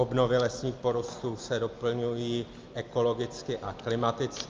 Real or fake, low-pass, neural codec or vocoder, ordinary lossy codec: real; 7.2 kHz; none; Opus, 32 kbps